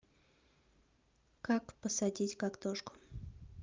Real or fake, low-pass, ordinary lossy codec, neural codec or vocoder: real; 7.2 kHz; Opus, 32 kbps; none